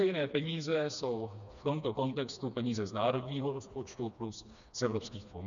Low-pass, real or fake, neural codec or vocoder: 7.2 kHz; fake; codec, 16 kHz, 2 kbps, FreqCodec, smaller model